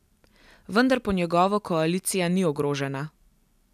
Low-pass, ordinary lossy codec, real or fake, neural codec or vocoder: 14.4 kHz; none; real; none